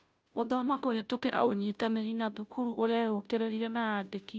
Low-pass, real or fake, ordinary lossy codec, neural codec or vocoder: none; fake; none; codec, 16 kHz, 0.5 kbps, FunCodec, trained on Chinese and English, 25 frames a second